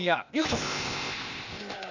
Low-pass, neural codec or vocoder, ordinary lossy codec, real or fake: 7.2 kHz; codec, 16 kHz, 0.8 kbps, ZipCodec; none; fake